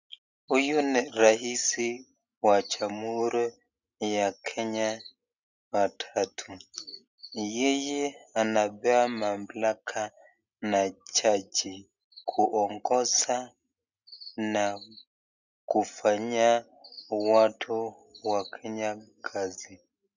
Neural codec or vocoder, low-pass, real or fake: none; 7.2 kHz; real